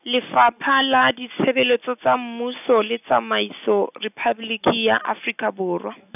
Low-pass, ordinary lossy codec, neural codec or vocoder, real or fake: 3.6 kHz; none; none; real